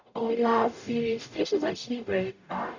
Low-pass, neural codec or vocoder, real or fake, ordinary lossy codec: 7.2 kHz; codec, 44.1 kHz, 0.9 kbps, DAC; fake; none